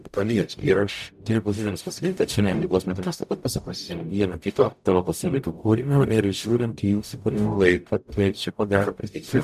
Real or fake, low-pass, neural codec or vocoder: fake; 14.4 kHz; codec, 44.1 kHz, 0.9 kbps, DAC